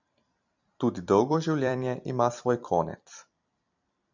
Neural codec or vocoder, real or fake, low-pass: vocoder, 44.1 kHz, 128 mel bands every 256 samples, BigVGAN v2; fake; 7.2 kHz